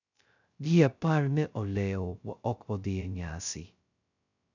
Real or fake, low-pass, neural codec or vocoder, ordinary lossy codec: fake; 7.2 kHz; codec, 16 kHz, 0.2 kbps, FocalCodec; none